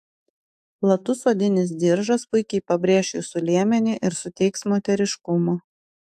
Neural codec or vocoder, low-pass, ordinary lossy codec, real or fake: autoencoder, 48 kHz, 128 numbers a frame, DAC-VAE, trained on Japanese speech; 14.4 kHz; AAC, 96 kbps; fake